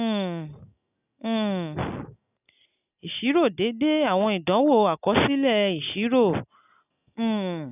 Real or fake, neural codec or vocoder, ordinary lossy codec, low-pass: real; none; none; 3.6 kHz